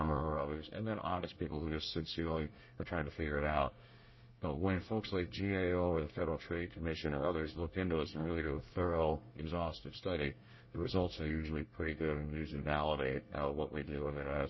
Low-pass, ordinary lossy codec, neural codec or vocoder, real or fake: 7.2 kHz; MP3, 24 kbps; codec, 24 kHz, 1 kbps, SNAC; fake